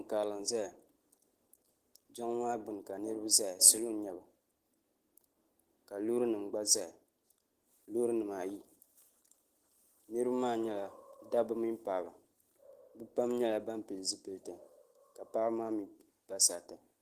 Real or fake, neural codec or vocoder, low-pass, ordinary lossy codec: real; none; 14.4 kHz; Opus, 16 kbps